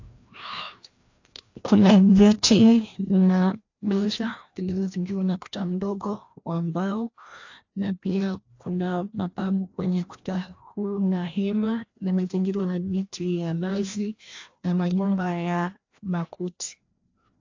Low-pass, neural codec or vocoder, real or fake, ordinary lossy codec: 7.2 kHz; codec, 16 kHz, 1 kbps, FreqCodec, larger model; fake; AAC, 48 kbps